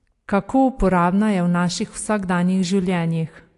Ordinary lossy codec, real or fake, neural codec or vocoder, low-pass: AAC, 48 kbps; real; none; 10.8 kHz